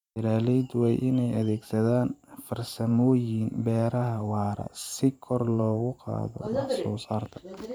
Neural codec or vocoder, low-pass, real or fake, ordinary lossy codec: vocoder, 44.1 kHz, 128 mel bands every 512 samples, BigVGAN v2; 19.8 kHz; fake; none